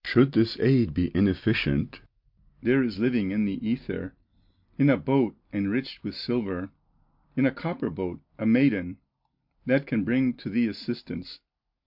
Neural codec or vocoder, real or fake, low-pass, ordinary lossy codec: none; real; 5.4 kHz; MP3, 48 kbps